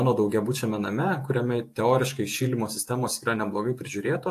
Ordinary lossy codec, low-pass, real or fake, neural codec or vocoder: AAC, 64 kbps; 14.4 kHz; fake; vocoder, 48 kHz, 128 mel bands, Vocos